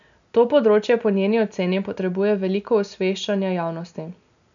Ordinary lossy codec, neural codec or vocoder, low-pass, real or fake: none; none; 7.2 kHz; real